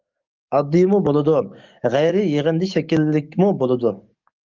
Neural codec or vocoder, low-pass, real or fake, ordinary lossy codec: codec, 44.1 kHz, 7.8 kbps, DAC; 7.2 kHz; fake; Opus, 32 kbps